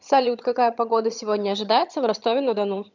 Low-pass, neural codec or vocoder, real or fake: 7.2 kHz; vocoder, 22.05 kHz, 80 mel bands, HiFi-GAN; fake